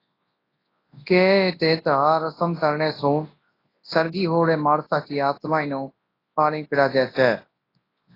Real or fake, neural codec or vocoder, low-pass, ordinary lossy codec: fake; codec, 24 kHz, 0.9 kbps, WavTokenizer, large speech release; 5.4 kHz; AAC, 24 kbps